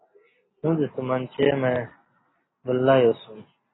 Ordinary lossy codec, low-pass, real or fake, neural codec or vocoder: AAC, 16 kbps; 7.2 kHz; real; none